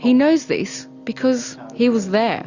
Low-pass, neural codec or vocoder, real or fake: 7.2 kHz; none; real